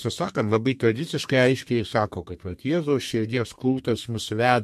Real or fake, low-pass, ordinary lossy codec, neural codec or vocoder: fake; 14.4 kHz; MP3, 64 kbps; codec, 32 kHz, 1.9 kbps, SNAC